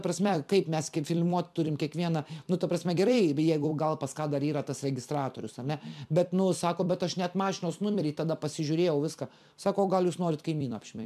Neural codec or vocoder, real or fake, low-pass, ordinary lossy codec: vocoder, 44.1 kHz, 128 mel bands every 256 samples, BigVGAN v2; fake; 14.4 kHz; MP3, 96 kbps